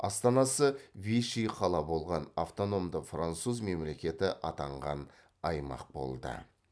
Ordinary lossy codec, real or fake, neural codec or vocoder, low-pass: none; real; none; none